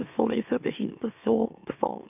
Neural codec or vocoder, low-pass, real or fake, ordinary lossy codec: autoencoder, 44.1 kHz, a latent of 192 numbers a frame, MeloTTS; 3.6 kHz; fake; none